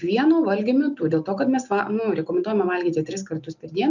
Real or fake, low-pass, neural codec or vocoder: real; 7.2 kHz; none